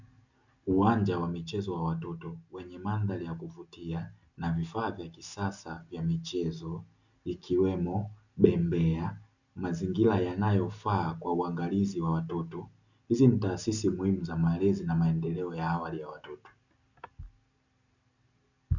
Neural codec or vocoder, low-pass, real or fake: none; 7.2 kHz; real